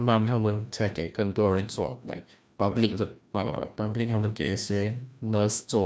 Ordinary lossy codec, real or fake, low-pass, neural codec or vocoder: none; fake; none; codec, 16 kHz, 1 kbps, FreqCodec, larger model